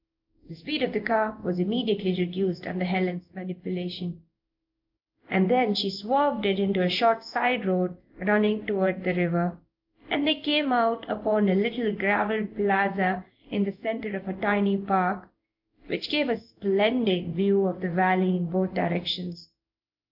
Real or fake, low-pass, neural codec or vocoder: fake; 5.4 kHz; codec, 16 kHz in and 24 kHz out, 1 kbps, XY-Tokenizer